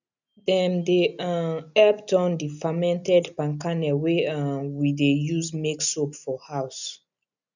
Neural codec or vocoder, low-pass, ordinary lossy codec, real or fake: none; 7.2 kHz; none; real